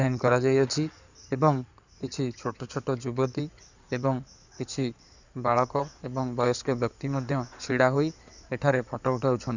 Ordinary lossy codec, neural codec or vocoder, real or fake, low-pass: none; codec, 16 kHz in and 24 kHz out, 2.2 kbps, FireRedTTS-2 codec; fake; 7.2 kHz